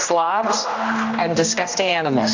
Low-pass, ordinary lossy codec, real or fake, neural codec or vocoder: 7.2 kHz; AAC, 48 kbps; fake; codec, 16 kHz, 1 kbps, X-Codec, HuBERT features, trained on general audio